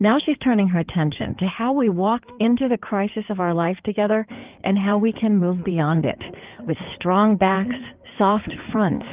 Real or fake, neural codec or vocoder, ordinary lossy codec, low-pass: fake; codec, 16 kHz in and 24 kHz out, 2.2 kbps, FireRedTTS-2 codec; Opus, 32 kbps; 3.6 kHz